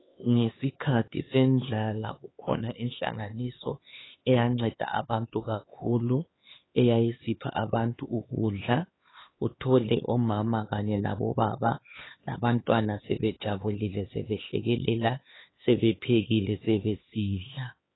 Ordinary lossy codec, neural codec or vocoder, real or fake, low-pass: AAC, 16 kbps; codec, 16 kHz, 4 kbps, X-Codec, HuBERT features, trained on LibriSpeech; fake; 7.2 kHz